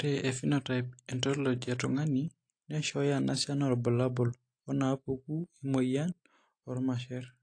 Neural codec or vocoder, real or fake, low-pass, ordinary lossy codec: none; real; 9.9 kHz; AAC, 48 kbps